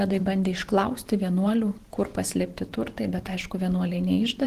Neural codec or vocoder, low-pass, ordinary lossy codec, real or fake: vocoder, 44.1 kHz, 128 mel bands every 512 samples, BigVGAN v2; 14.4 kHz; Opus, 16 kbps; fake